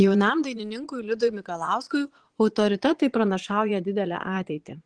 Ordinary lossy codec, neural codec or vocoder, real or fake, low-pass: Opus, 16 kbps; vocoder, 22.05 kHz, 80 mel bands, Vocos; fake; 9.9 kHz